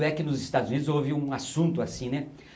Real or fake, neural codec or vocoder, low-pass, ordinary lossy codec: real; none; none; none